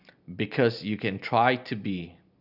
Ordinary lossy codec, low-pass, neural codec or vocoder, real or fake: none; 5.4 kHz; none; real